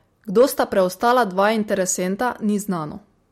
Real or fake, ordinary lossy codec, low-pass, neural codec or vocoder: real; MP3, 64 kbps; 19.8 kHz; none